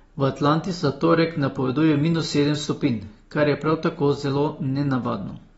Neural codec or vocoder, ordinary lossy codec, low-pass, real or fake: none; AAC, 24 kbps; 19.8 kHz; real